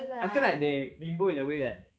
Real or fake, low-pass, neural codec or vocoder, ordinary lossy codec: fake; none; codec, 16 kHz, 2 kbps, X-Codec, HuBERT features, trained on balanced general audio; none